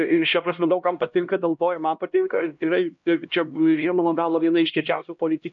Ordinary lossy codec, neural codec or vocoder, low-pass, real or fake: MP3, 64 kbps; codec, 16 kHz, 1 kbps, X-Codec, HuBERT features, trained on LibriSpeech; 7.2 kHz; fake